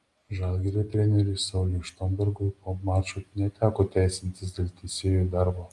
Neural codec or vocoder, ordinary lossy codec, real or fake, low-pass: none; Opus, 32 kbps; real; 10.8 kHz